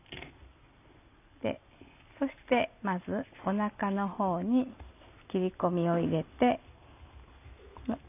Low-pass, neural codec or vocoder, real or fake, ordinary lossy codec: 3.6 kHz; none; real; none